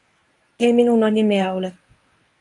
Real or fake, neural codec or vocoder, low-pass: fake; codec, 24 kHz, 0.9 kbps, WavTokenizer, medium speech release version 1; 10.8 kHz